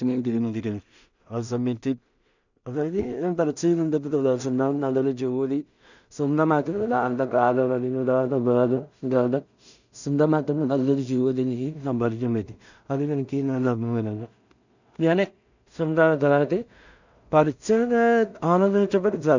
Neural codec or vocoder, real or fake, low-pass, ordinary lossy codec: codec, 16 kHz in and 24 kHz out, 0.4 kbps, LongCat-Audio-Codec, two codebook decoder; fake; 7.2 kHz; none